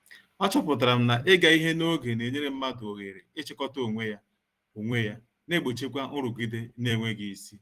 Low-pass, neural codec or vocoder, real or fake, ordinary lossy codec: 14.4 kHz; vocoder, 44.1 kHz, 128 mel bands every 256 samples, BigVGAN v2; fake; Opus, 24 kbps